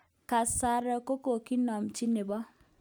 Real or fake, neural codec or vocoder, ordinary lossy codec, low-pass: real; none; none; none